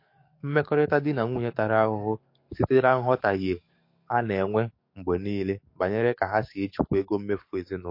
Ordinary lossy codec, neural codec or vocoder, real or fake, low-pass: MP3, 32 kbps; codec, 44.1 kHz, 7.8 kbps, DAC; fake; 5.4 kHz